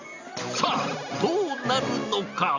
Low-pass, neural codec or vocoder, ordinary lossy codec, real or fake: 7.2 kHz; none; Opus, 64 kbps; real